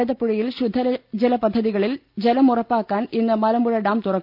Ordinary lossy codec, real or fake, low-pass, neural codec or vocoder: Opus, 24 kbps; real; 5.4 kHz; none